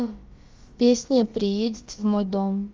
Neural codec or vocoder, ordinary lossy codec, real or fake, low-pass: codec, 16 kHz, about 1 kbps, DyCAST, with the encoder's durations; Opus, 32 kbps; fake; 7.2 kHz